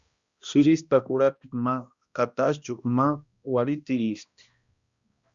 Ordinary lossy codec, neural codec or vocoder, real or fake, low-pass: Opus, 64 kbps; codec, 16 kHz, 1 kbps, X-Codec, HuBERT features, trained on balanced general audio; fake; 7.2 kHz